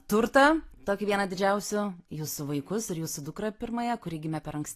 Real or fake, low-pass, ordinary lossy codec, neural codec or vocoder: real; 14.4 kHz; AAC, 48 kbps; none